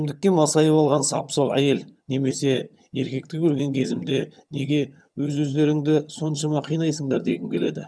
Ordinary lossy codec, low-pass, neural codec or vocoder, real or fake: none; none; vocoder, 22.05 kHz, 80 mel bands, HiFi-GAN; fake